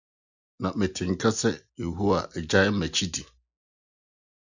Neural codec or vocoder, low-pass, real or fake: none; 7.2 kHz; real